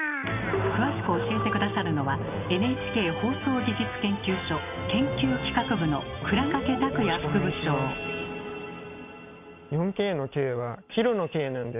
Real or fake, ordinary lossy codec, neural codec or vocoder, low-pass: real; none; none; 3.6 kHz